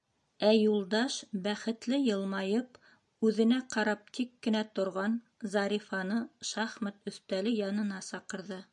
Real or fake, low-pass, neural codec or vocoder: real; 10.8 kHz; none